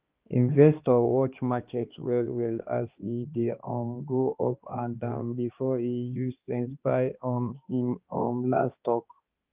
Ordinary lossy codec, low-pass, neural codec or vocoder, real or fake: Opus, 32 kbps; 3.6 kHz; codec, 16 kHz, 2 kbps, X-Codec, HuBERT features, trained on balanced general audio; fake